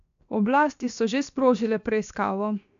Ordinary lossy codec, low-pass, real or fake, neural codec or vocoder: none; 7.2 kHz; fake; codec, 16 kHz, 0.7 kbps, FocalCodec